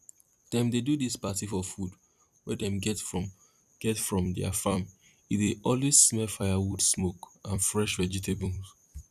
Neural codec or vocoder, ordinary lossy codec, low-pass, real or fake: vocoder, 44.1 kHz, 128 mel bands every 256 samples, BigVGAN v2; none; 14.4 kHz; fake